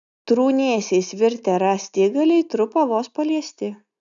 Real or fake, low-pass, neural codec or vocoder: real; 7.2 kHz; none